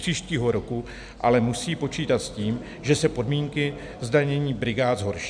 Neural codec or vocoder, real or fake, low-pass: none; real; 9.9 kHz